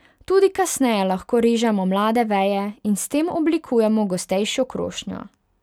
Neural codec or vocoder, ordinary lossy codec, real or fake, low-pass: none; none; real; 19.8 kHz